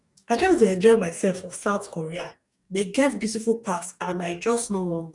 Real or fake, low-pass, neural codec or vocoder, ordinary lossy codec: fake; 10.8 kHz; codec, 44.1 kHz, 2.6 kbps, DAC; none